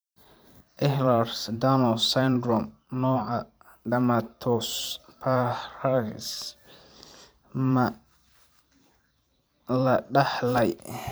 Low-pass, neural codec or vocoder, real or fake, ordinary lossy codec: none; vocoder, 44.1 kHz, 128 mel bands every 512 samples, BigVGAN v2; fake; none